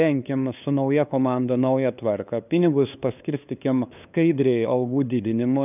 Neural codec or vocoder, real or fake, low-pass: codec, 16 kHz, 2 kbps, FunCodec, trained on LibriTTS, 25 frames a second; fake; 3.6 kHz